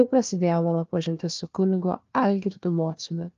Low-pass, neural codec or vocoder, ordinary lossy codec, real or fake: 7.2 kHz; codec, 16 kHz, 1 kbps, FunCodec, trained on Chinese and English, 50 frames a second; Opus, 32 kbps; fake